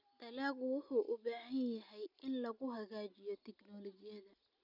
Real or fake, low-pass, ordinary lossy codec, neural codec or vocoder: real; 5.4 kHz; none; none